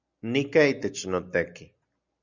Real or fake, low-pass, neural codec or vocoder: real; 7.2 kHz; none